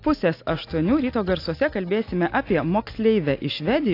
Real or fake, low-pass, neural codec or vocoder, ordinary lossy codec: real; 5.4 kHz; none; AAC, 32 kbps